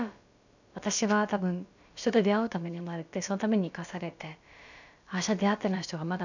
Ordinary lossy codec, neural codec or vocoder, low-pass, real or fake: none; codec, 16 kHz, about 1 kbps, DyCAST, with the encoder's durations; 7.2 kHz; fake